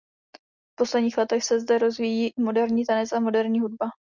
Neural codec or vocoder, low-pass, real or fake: none; 7.2 kHz; real